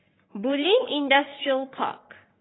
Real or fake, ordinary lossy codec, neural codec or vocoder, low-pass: fake; AAC, 16 kbps; codec, 44.1 kHz, 3.4 kbps, Pupu-Codec; 7.2 kHz